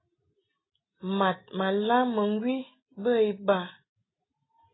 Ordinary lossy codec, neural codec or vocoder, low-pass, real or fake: AAC, 16 kbps; none; 7.2 kHz; real